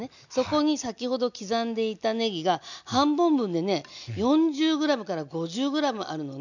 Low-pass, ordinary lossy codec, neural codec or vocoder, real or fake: 7.2 kHz; none; none; real